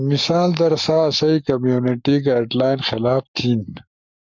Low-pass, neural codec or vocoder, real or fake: 7.2 kHz; codec, 44.1 kHz, 7.8 kbps, Pupu-Codec; fake